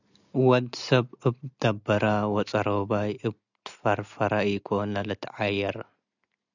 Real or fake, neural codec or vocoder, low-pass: real; none; 7.2 kHz